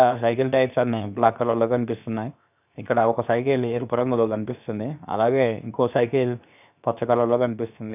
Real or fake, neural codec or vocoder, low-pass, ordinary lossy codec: fake; codec, 16 kHz, 0.7 kbps, FocalCodec; 3.6 kHz; none